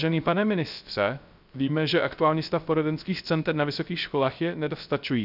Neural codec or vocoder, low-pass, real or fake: codec, 16 kHz, 0.3 kbps, FocalCodec; 5.4 kHz; fake